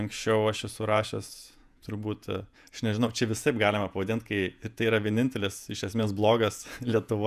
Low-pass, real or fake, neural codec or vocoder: 14.4 kHz; fake; vocoder, 48 kHz, 128 mel bands, Vocos